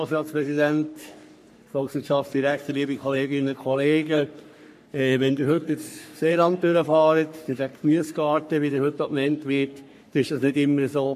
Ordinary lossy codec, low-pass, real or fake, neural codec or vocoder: MP3, 64 kbps; 14.4 kHz; fake; codec, 44.1 kHz, 3.4 kbps, Pupu-Codec